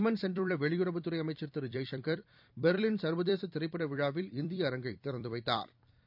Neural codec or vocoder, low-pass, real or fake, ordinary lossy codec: vocoder, 44.1 kHz, 128 mel bands every 256 samples, BigVGAN v2; 5.4 kHz; fake; none